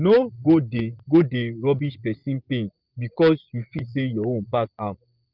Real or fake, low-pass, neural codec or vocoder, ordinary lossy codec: fake; 5.4 kHz; vocoder, 24 kHz, 100 mel bands, Vocos; Opus, 24 kbps